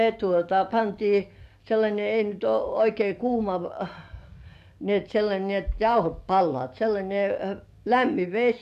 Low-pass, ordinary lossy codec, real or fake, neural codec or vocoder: 14.4 kHz; none; fake; codec, 44.1 kHz, 7.8 kbps, DAC